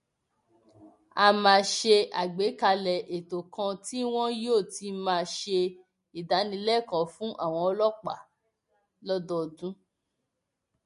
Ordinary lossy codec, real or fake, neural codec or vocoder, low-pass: MP3, 48 kbps; real; none; 10.8 kHz